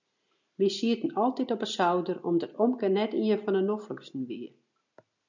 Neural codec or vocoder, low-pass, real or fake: none; 7.2 kHz; real